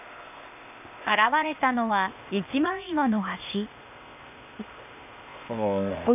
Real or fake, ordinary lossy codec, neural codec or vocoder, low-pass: fake; none; codec, 16 kHz, 0.8 kbps, ZipCodec; 3.6 kHz